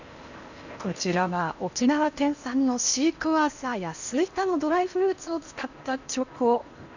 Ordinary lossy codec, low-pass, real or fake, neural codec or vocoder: none; 7.2 kHz; fake; codec, 16 kHz in and 24 kHz out, 0.8 kbps, FocalCodec, streaming, 65536 codes